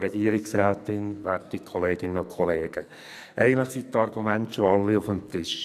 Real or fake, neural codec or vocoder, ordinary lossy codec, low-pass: fake; codec, 44.1 kHz, 2.6 kbps, SNAC; none; 14.4 kHz